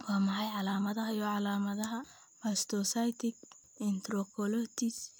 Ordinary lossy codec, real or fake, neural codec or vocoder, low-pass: none; real; none; none